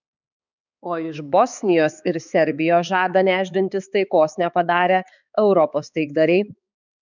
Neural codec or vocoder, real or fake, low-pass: codec, 16 kHz, 4 kbps, X-Codec, HuBERT features, trained on balanced general audio; fake; 7.2 kHz